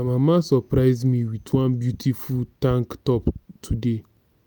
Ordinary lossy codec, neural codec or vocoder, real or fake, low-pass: none; none; real; none